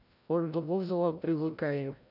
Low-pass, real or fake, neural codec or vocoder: 5.4 kHz; fake; codec, 16 kHz, 0.5 kbps, FreqCodec, larger model